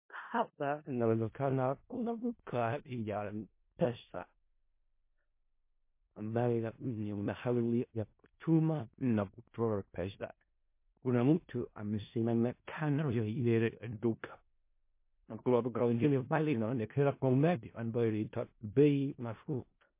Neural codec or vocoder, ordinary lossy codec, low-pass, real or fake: codec, 16 kHz in and 24 kHz out, 0.4 kbps, LongCat-Audio-Codec, four codebook decoder; MP3, 24 kbps; 3.6 kHz; fake